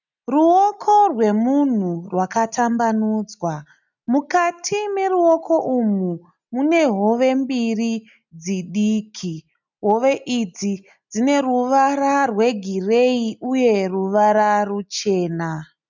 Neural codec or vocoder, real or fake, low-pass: none; real; 7.2 kHz